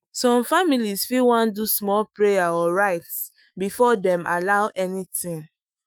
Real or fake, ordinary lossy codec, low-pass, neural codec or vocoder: fake; none; none; autoencoder, 48 kHz, 128 numbers a frame, DAC-VAE, trained on Japanese speech